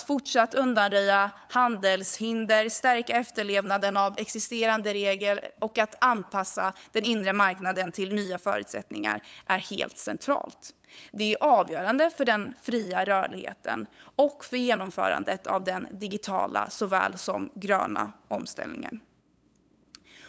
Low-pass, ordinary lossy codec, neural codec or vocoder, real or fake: none; none; codec, 16 kHz, 8 kbps, FunCodec, trained on LibriTTS, 25 frames a second; fake